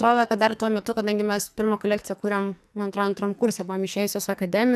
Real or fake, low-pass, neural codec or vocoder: fake; 14.4 kHz; codec, 44.1 kHz, 2.6 kbps, SNAC